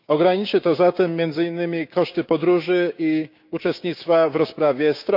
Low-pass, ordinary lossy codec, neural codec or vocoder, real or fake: 5.4 kHz; none; codec, 16 kHz, 6 kbps, DAC; fake